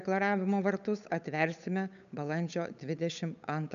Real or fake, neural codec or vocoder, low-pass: fake; codec, 16 kHz, 8 kbps, FunCodec, trained on Chinese and English, 25 frames a second; 7.2 kHz